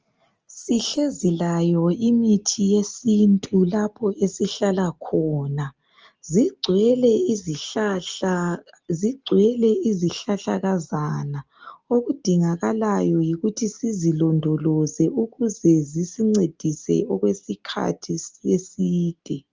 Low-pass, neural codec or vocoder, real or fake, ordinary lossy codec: 7.2 kHz; none; real; Opus, 24 kbps